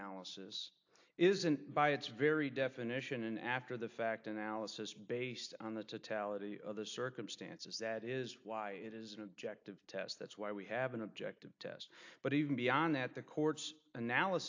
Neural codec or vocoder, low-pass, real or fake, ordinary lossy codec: none; 7.2 kHz; real; AAC, 48 kbps